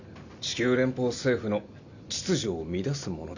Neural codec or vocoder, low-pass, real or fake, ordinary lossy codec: none; 7.2 kHz; real; none